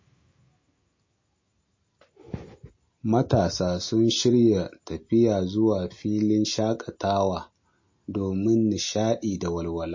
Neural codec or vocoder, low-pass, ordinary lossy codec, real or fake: none; 7.2 kHz; MP3, 32 kbps; real